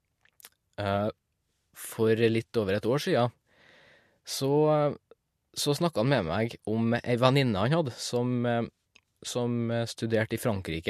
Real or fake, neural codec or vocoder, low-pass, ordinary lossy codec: real; none; 14.4 kHz; MP3, 64 kbps